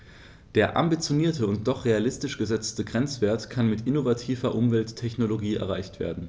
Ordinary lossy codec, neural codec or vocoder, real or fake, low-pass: none; none; real; none